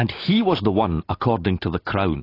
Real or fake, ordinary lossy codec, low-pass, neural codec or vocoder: real; AAC, 24 kbps; 5.4 kHz; none